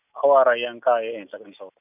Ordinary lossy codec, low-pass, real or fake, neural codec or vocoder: none; 3.6 kHz; real; none